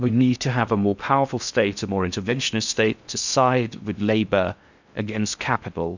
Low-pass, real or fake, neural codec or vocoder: 7.2 kHz; fake; codec, 16 kHz in and 24 kHz out, 0.6 kbps, FocalCodec, streaming, 2048 codes